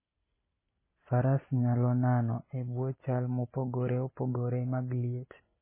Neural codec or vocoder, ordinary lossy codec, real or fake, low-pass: none; MP3, 16 kbps; real; 3.6 kHz